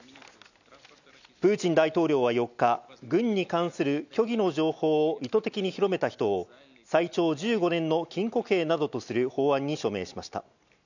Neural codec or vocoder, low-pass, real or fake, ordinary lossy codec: none; 7.2 kHz; real; none